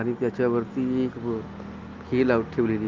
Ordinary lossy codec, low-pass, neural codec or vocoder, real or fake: Opus, 32 kbps; 7.2 kHz; none; real